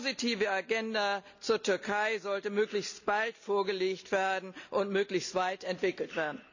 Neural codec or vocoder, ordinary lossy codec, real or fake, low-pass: none; none; real; 7.2 kHz